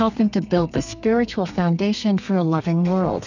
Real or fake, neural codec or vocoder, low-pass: fake; codec, 32 kHz, 1.9 kbps, SNAC; 7.2 kHz